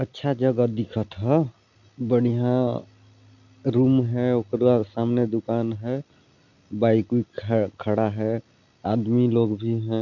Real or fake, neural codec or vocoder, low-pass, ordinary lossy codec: real; none; 7.2 kHz; none